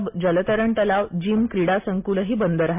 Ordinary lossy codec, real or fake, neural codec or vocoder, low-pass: MP3, 32 kbps; real; none; 3.6 kHz